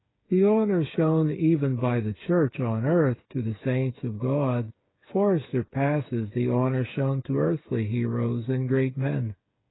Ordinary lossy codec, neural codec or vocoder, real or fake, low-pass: AAC, 16 kbps; codec, 16 kHz, 8 kbps, FreqCodec, smaller model; fake; 7.2 kHz